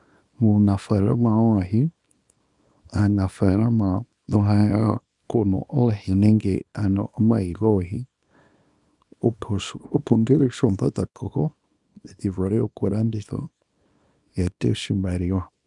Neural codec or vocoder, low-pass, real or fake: codec, 24 kHz, 0.9 kbps, WavTokenizer, small release; 10.8 kHz; fake